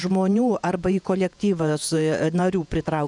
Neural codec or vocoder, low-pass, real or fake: vocoder, 48 kHz, 128 mel bands, Vocos; 10.8 kHz; fake